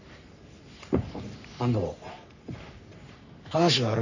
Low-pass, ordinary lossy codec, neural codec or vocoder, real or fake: 7.2 kHz; none; codec, 44.1 kHz, 3.4 kbps, Pupu-Codec; fake